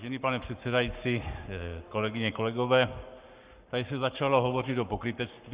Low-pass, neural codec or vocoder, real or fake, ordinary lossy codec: 3.6 kHz; codec, 44.1 kHz, 7.8 kbps, Pupu-Codec; fake; Opus, 64 kbps